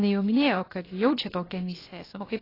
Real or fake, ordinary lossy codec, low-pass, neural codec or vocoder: fake; AAC, 24 kbps; 5.4 kHz; codec, 16 kHz, about 1 kbps, DyCAST, with the encoder's durations